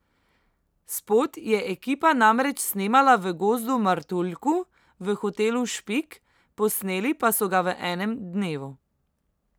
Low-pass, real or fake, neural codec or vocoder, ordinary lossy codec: none; real; none; none